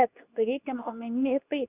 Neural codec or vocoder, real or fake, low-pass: codec, 24 kHz, 0.9 kbps, WavTokenizer, medium speech release version 2; fake; 3.6 kHz